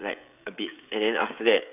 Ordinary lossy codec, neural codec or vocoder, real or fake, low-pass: none; codec, 16 kHz, 16 kbps, FreqCodec, smaller model; fake; 3.6 kHz